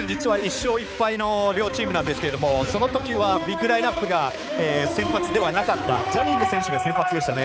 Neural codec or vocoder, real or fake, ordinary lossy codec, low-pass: codec, 16 kHz, 4 kbps, X-Codec, HuBERT features, trained on balanced general audio; fake; none; none